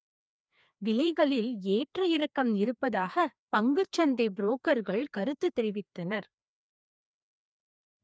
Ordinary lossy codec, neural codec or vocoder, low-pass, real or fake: none; codec, 16 kHz, 2 kbps, FreqCodec, larger model; none; fake